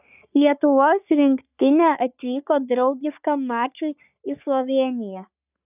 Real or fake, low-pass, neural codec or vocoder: fake; 3.6 kHz; codec, 44.1 kHz, 3.4 kbps, Pupu-Codec